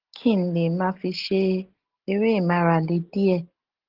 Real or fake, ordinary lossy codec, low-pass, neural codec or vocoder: real; Opus, 16 kbps; 5.4 kHz; none